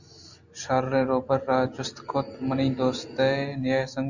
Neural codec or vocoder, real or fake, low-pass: none; real; 7.2 kHz